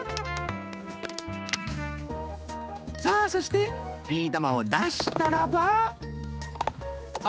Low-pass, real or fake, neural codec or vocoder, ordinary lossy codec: none; fake; codec, 16 kHz, 2 kbps, X-Codec, HuBERT features, trained on general audio; none